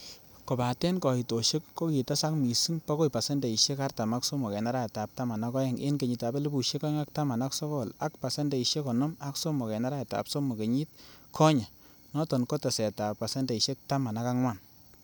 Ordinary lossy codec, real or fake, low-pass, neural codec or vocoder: none; real; none; none